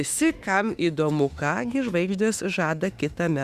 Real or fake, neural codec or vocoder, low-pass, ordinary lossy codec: fake; autoencoder, 48 kHz, 32 numbers a frame, DAC-VAE, trained on Japanese speech; 14.4 kHz; Opus, 64 kbps